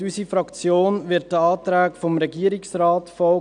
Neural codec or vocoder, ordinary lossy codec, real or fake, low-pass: none; MP3, 96 kbps; real; 9.9 kHz